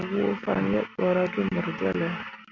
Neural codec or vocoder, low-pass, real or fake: none; 7.2 kHz; real